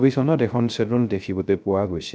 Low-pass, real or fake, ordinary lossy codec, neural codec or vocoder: none; fake; none; codec, 16 kHz, 0.3 kbps, FocalCodec